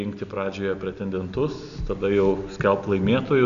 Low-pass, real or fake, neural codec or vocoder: 7.2 kHz; real; none